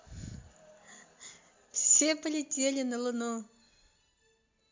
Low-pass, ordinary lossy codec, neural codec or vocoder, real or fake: 7.2 kHz; MP3, 48 kbps; none; real